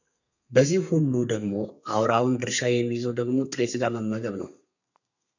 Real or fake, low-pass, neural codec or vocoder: fake; 7.2 kHz; codec, 32 kHz, 1.9 kbps, SNAC